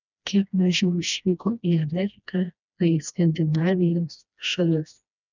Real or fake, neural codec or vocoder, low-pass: fake; codec, 16 kHz, 1 kbps, FreqCodec, smaller model; 7.2 kHz